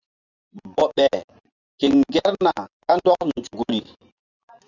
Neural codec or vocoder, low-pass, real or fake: vocoder, 24 kHz, 100 mel bands, Vocos; 7.2 kHz; fake